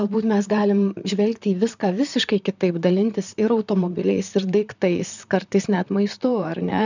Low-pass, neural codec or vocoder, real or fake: 7.2 kHz; vocoder, 44.1 kHz, 128 mel bands, Pupu-Vocoder; fake